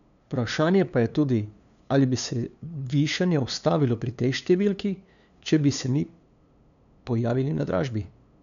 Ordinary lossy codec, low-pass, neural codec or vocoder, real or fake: none; 7.2 kHz; codec, 16 kHz, 2 kbps, FunCodec, trained on LibriTTS, 25 frames a second; fake